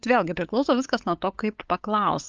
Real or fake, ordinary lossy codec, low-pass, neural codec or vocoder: fake; Opus, 24 kbps; 7.2 kHz; codec, 16 kHz, 8 kbps, FunCodec, trained on LibriTTS, 25 frames a second